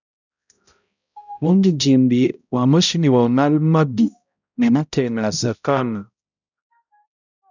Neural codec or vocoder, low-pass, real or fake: codec, 16 kHz, 0.5 kbps, X-Codec, HuBERT features, trained on balanced general audio; 7.2 kHz; fake